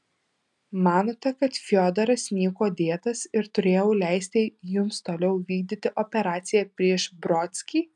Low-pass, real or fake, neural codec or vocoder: 10.8 kHz; real; none